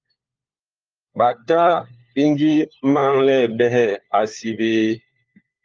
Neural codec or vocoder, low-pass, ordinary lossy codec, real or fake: codec, 16 kHz, 4 kbps, FunCodec, trained on LibriTTS, 50 frames a second; 7.2 kHz; Opus, 24 kbps; fake